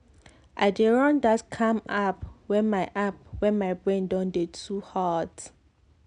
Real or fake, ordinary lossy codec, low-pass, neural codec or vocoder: real; none; 9.9 kHz; none